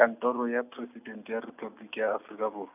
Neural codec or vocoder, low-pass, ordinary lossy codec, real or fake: none; 3.6 kHz; none; real